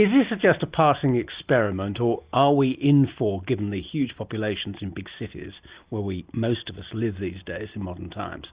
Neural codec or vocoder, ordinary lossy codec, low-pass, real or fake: none; Opus, 64 kbps; 3.6 kHz; real